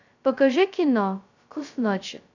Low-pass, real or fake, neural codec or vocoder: 7.2 kHz; fake; codec, 16 kHz, 0.2 kbps, FocalCodec